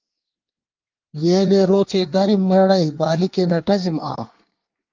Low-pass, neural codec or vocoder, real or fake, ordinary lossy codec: 7.2 kHz; codec, 24 kHz, 1 kbps, SNAC; fake; Opus, 24 kbps